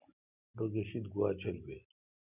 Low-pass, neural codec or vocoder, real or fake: 3.6 kHz; none; real